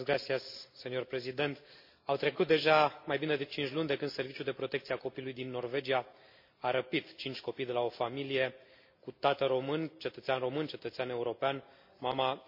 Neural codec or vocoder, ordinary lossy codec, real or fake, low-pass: none; MP3, 32 kbps; real; 5.4 kHz